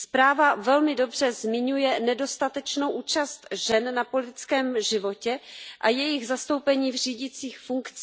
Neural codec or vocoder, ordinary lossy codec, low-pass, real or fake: none; none; none; real